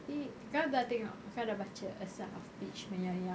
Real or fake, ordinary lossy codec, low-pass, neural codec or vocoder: real; none; none; none